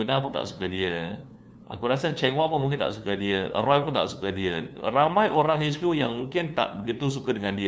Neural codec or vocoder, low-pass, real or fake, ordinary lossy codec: codec, 16 kHz, 2 kbps, FunCodec, trained on LibriTTS, 25 frames a second; none; fake; none